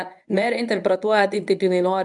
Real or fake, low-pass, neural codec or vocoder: fake; 10.8 kHz; codec, 24 kHz, 0.9 kbps, WavTokenizer, medium speech release version 1